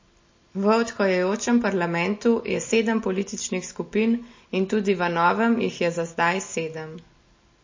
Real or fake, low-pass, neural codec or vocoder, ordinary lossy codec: real; 7.2 kHz; none; MP3, 32 kbps